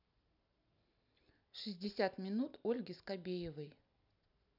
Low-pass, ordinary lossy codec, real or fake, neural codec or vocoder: 5.4 kHz; none; real; none